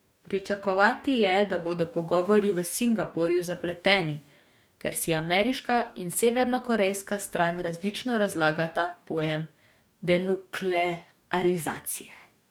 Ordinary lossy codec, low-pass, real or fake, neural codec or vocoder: none; none; fake; codec, 44.1 kHz, 2.6 kbps, DAC